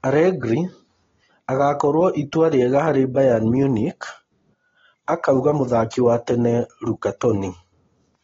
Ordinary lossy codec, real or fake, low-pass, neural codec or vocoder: AAC, 24 kbps; real; 7.2 kHz; none